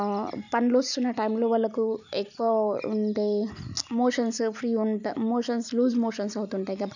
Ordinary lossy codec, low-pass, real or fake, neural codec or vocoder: none; 7.2 kHz; real; none